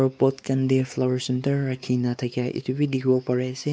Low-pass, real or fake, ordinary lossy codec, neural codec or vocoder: none; fake; none; codec, 16 kHz, 4 kbps, X-Codec, WavLM features, trained on Multilingual LibriSpeech